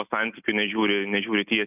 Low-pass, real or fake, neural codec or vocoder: 3.6 kHz; real; none